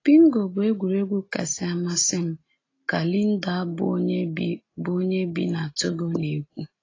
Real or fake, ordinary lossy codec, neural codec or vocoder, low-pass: real; AAC, 32 kbps; none; 7.2 kHz